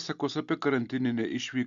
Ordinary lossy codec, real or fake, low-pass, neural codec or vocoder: Opus, 64 kbps; real; 7.2 kHz; none